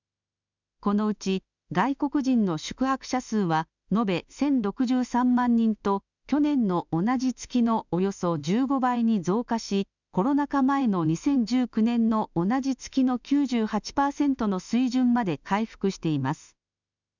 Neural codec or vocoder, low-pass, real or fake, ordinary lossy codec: autoencoder, 48 kHz, 32 numbers a frame, DAC-VAE, trained on Japanese speech; 7.2 kHz; fake; none